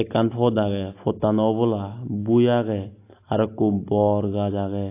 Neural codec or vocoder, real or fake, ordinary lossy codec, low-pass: none; real; AAC, 24 kbps; 3.6 kHz